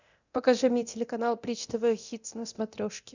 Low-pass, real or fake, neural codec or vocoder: 7.2 kHz; fake; codec, 24 kHz, 0.9 kbps, DualCodec